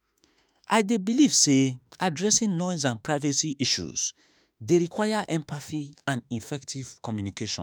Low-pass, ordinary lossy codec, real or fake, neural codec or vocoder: none; none; fake; autoencoder, 48 kHz, 32 numbers a frame, DAC-VAE, trained on Japanese speech